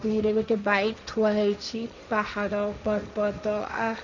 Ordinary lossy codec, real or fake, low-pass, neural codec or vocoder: none; fake; 7.2 kHz; codec, 16 kHz, 1.1 kbps, Voila-Tokenizer